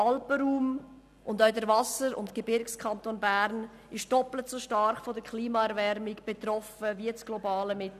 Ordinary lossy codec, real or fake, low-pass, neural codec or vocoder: none; real; 14.4 kHz; none